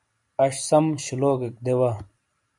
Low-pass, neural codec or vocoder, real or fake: 10.8 kHz; none; real